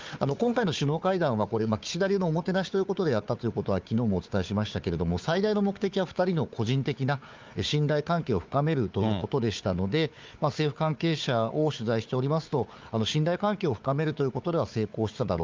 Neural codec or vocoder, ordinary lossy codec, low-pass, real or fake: codec, 16 kHz, 4 kbps, FunCodec, trained on Chinese and English, 50 frames a second; Opus, 32 kbps; 7.2 kHz; fake